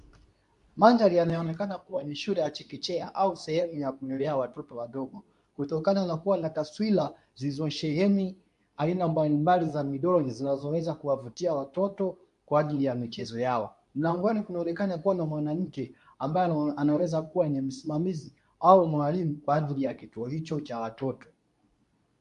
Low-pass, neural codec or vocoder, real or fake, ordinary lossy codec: 10.8 kHz; codec, 24 kHz, 0.9 kbps, WavTokenizer, medium speech release version 2; fake; AAC, 96 kbps